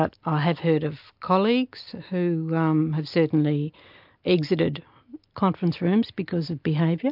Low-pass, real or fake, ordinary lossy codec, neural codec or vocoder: 5.4 kHz; real; MP3, 48 kbps; none